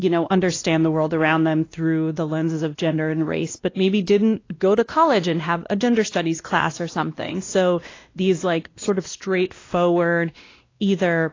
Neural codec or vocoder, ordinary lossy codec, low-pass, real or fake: codec, 16 kHz, 1 kbps, X-Codec, WavLM features, trained on Multilingual LibriSpeech; AAC, 32 kbps; 7.2 kHz; fake